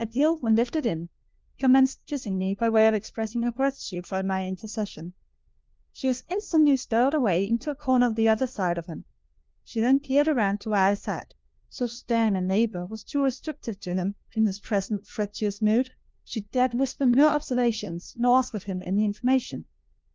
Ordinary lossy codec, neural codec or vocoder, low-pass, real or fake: Opus, 32 kbps; codec, 16 kHz, 1 kbps, FunCodec, trained on LibriTTS, 50 frames a second; 7.2 kHz; fake